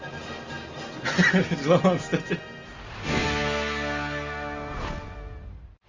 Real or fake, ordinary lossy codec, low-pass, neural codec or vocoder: real; Opus, 32 kbps; 7.2 kHz; none